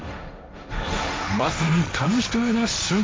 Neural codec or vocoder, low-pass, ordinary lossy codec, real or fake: codec, 16 kHz, 1.1 kbps, Voila-Tokenizer; none; none; fake